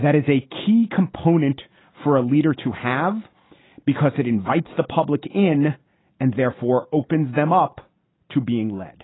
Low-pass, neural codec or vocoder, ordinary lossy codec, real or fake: 7.2 kHz; none; AAC, 16 kbps; real